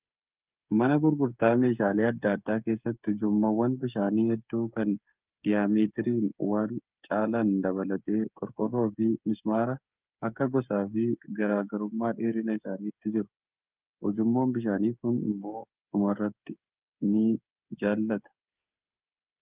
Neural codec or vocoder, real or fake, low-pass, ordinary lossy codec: codec, 16 kHz, 8 kbps, FreqCodec, smaller model; fake; 3.6 kHz; Opus, 24 kbps